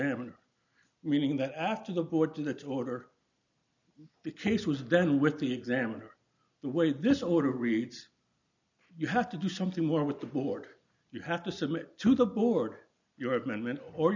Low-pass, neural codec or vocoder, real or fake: 7.2 kHz; none; real